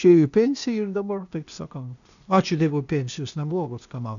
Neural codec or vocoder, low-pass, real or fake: codec, 16 kHz, 0.8 kbps, ZipCodec; 7.2 kHz; fake